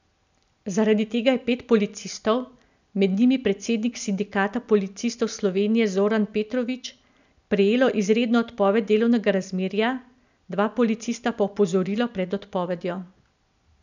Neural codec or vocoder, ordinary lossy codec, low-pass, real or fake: none; none; 7.2 kHz; real